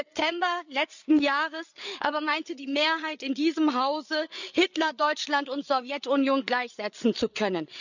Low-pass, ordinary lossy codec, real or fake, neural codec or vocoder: 7.2 kHz; none; fake; codec, 16 kHz, 8 kbps, FreqCodec, larger model